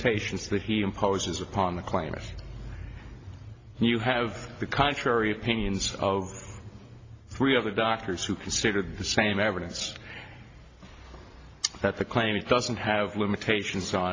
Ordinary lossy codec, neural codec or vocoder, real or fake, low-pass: AAC, 32 kbps; none; real; 7.2 kHz